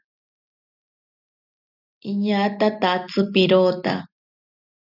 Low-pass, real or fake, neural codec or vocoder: 5.4 kHz; real; none